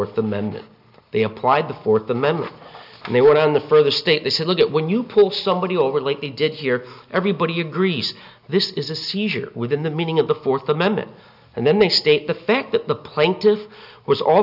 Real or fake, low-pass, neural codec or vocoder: real; 5.4 kHz; none